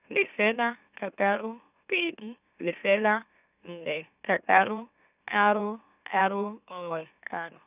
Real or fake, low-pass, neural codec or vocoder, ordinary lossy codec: fake; 3.6 kHz; autoencoder, 44.1 kHz, a latent of 192 numbers a frame, MeloTTS; none